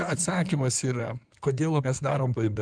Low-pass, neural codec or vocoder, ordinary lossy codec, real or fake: 9.9 kHz; codec, 16 kHz in and 24 kHz out, 2.2 kbps, FireRedTTS-2 codec; Opus, 32 kbps; fake